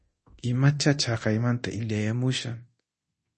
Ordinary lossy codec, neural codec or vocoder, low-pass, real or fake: MP3, 32 kbps; codec, 24 kHz, 0.9 kbps, DualCodec; 10.8 kHz; fake